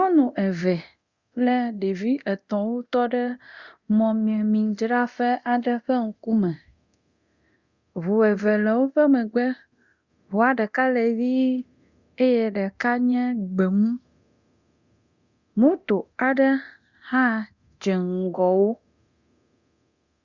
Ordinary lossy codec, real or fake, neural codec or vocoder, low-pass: Opus, 64 kbps; fake; codec, 24 kHz, 0.9 kbps, DualCodec; 7.2 kHz